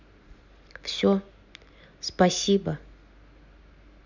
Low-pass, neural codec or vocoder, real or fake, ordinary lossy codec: 7.2 kHz; none; real; none